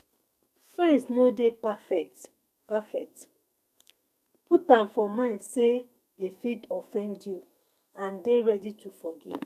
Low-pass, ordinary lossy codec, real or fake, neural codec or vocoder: 14.4 kHz; none; fake; codec, 44.1 kHz, 2.6 kbps, SNAC